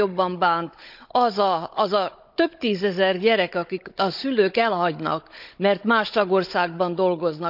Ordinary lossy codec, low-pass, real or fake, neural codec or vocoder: none; 5.4 kHz; fake; codec, 16 kHz, 16 kbps, FunCodec, trained on Chinese and English, 50 frames a second